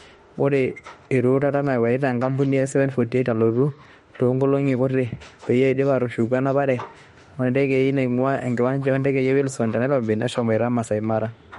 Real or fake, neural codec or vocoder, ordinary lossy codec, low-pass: fake; autoencoder, 48 kHz, 32 numbers a frame, DAC-VAE, trained on Japanese speech; MP3, 48 kbps; 19.8 kHz